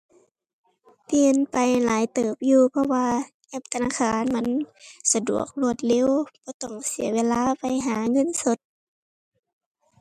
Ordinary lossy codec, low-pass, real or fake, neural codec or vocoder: MP3, 96 kbps; 14.4 kHz; real; none